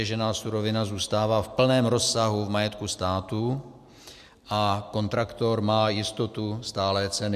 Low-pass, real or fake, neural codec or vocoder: 14.4 kHz; real; none